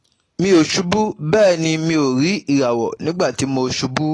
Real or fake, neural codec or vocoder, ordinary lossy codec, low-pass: real; none; AAC, 32 kbps; 9.9 kHz